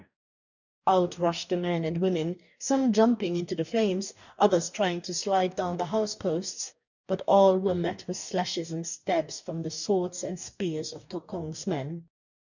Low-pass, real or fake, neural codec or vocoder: 7.2 kHz; fake; codec, 44.1 kHz, 2.6 kbps, DAC